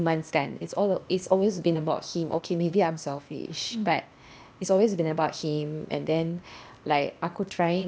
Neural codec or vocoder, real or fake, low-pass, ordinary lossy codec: codec, 16 kHz, 0.8 kbps, ZipCodec; fake; none; none